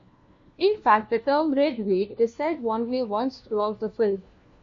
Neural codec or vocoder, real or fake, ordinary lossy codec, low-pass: codec, 16 kHz, 1 kbps, FunCodec, trained on LibriTTS, 50 frames a second; fake; MP3, 48 kbps; 7.2 kHz